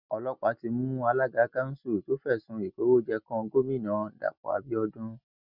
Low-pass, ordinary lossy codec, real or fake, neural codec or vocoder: 5.4 kHz; none; real; none